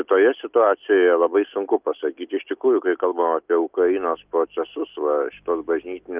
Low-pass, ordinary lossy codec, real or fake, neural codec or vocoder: 3.6 kHz; Opus, 24 kbps; real; none